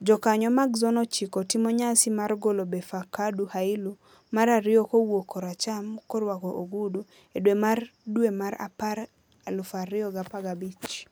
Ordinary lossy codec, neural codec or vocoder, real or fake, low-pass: none; none; real; none